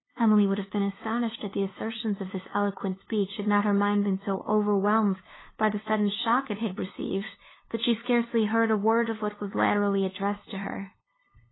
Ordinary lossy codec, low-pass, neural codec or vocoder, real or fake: AAC, 16 kbps; 7.2 kHz; codec, 16 kHz, 2 kbps, FunCodec, trained on LibriTTS, 25 frames a second; fake